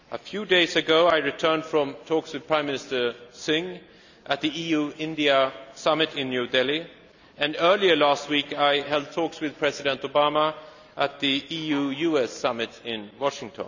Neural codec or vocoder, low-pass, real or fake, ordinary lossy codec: none; 7.2 kHz; real; none